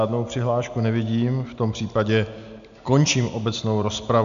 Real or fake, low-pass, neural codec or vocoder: real; 7.2 kHz; none